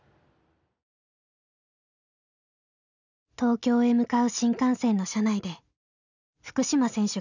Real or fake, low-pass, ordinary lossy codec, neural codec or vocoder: real; 7.2 kHz; none; none